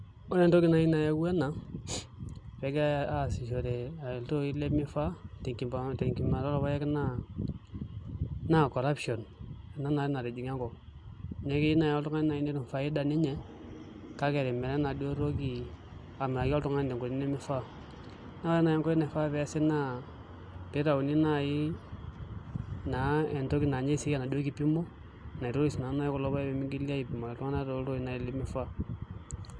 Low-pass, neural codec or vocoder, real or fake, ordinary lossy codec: 9.9 kHz; none; real; Opus, 64 kbps